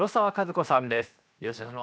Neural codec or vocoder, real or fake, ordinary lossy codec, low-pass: codec, 16 kHz, 0.7 kbps, FocalCodec; fake; none; none